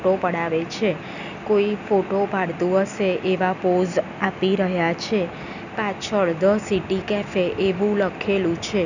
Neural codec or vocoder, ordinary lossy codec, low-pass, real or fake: none; none; 7.2 kHz; real